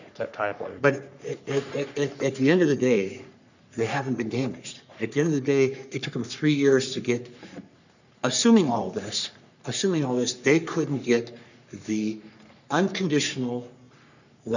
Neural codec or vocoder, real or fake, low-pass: codec, 44.1 kHz, 3.4 kbps, Pupu-Codec; fake; 7.2 kHz